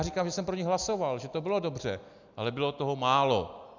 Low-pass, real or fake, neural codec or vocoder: 7.2 kHz; real; none